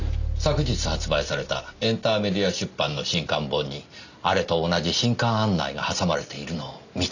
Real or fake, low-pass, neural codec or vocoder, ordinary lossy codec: real; 7.2 kHz; none; none